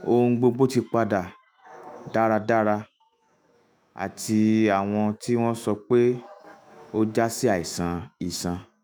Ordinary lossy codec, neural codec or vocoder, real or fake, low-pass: none; autoencoder, 48 kHz, 128 numbers a frame, DAC-VAE, trained on Japanese speech; fake; none